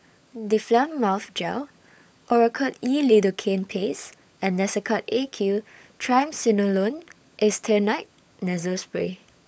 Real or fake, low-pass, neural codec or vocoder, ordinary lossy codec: fake; none; codec, 16 kHz, 16 kbps, FunCodec, trained on LibriTTS, 50 frames a second; none